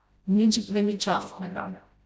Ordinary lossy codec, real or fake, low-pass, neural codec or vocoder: none; fake; none; codec, 16 kHz, 0.5 kbps, FreqCodec, smaller model